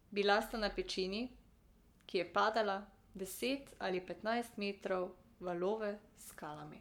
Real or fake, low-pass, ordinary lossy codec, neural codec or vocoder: fake; 19.8 kHz; MP3, 96 kbps; codec, 44.1 kHz, 7.8 kbps, Pupu-Codec